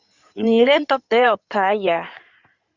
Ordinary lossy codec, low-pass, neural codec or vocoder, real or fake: Opus, 64 kbps; 7.2 kHz; codec, 16 kHz in and 24 kHz out, 2.2 kbps, FireRedTTS-2 codec; fake